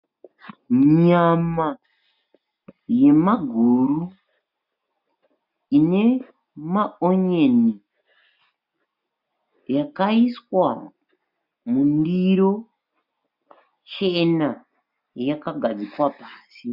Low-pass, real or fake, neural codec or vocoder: 5.4 kHz; real; none